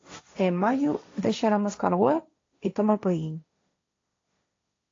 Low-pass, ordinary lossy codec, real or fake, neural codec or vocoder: 7.2 kHz; AAC, 32 kbps; fake; codec, 16 kHz, 1.1 kbps, Voila-Tokenizer